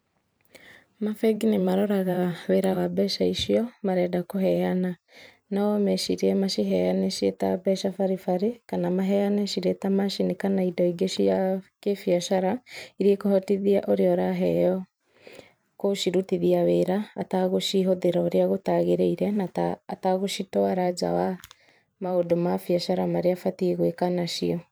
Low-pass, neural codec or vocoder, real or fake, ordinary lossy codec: none; vocoder, 44.1 kHz, 128 mel bands every 512 samples, BigVGAN v2; fake; none